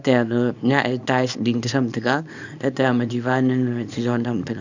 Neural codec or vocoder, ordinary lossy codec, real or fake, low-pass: codec, 24 kHz, 0.9 kbps, WavTokenizer, small release; none; fake; 7.2 kHz